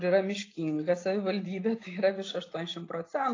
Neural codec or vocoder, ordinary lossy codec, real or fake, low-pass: none; AAC, 32 kbps; real; 7.2 kHz